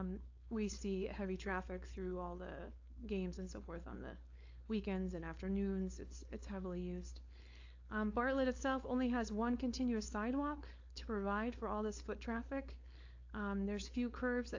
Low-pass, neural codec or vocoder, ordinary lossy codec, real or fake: 7.2 kHz; codec, 16 kHz, 4.8 kbps, FACodec; AAC, 48 kbps; fake